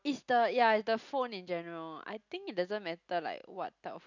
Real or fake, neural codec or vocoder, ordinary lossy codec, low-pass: real; none; none; 7.2 kHz